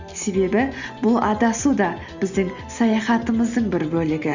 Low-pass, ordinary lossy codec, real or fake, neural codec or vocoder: 7.2 kHz; Opus, 64 kbps; real; none